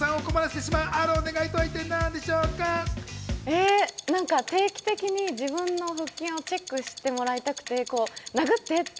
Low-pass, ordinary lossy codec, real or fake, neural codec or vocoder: none; none; real; none